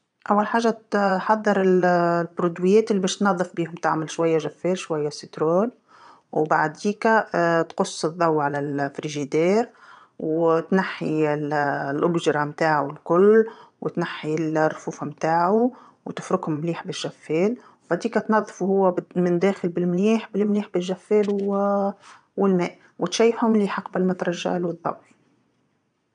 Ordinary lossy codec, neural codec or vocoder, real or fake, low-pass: none; vocoder, 22.05 kHz, 80 mel bands, Vocos; fake; 9.9 kHz